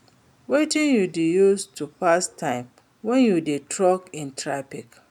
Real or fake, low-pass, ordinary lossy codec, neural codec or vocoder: real; 19.8 kHz; none; none